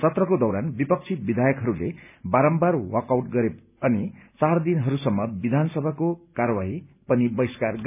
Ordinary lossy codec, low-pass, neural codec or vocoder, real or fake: none; 3.6 kHz; none; real